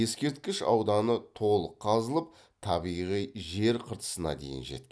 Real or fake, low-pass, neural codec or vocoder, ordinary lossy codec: real; none; none; none